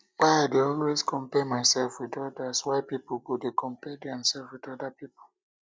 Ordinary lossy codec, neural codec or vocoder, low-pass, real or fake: none; none; none; real